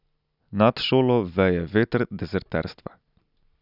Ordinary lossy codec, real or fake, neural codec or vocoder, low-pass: none; real; none; 5.4 kHz